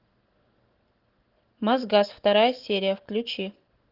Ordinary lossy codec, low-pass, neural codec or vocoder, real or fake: Opus, 16 kbps; 5.4 kHz; none; real